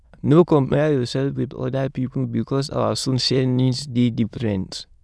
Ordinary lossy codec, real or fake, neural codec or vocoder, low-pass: none; fake; autoencoder, 22.05 kHz, a latent of 192 numbers a frame, VITS, trained on many speakers; none